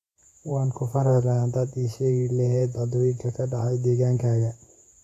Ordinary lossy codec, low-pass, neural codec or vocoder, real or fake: AAC, 48 kbps; 14.4 kHz; vocoder, 48 kHz, 128 mel bands, Vocos; fake